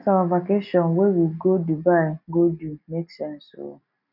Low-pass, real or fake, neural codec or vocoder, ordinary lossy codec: 5.4 kHz; real; none; none